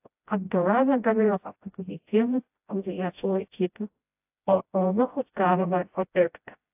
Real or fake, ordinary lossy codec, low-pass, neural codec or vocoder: fake; AAC, 32 kbps; 3.6 kHz; codec, 16 kHz, 0.5 kbps, FreqCodec, smaller model